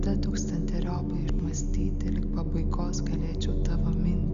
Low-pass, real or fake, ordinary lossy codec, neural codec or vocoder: 7.2 kHz; real; Opus, 64 kbps; none